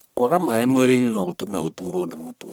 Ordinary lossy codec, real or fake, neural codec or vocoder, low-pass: none; fake; codec, 44.1 kHz, 1.7 kbps, Pupu-Codec; none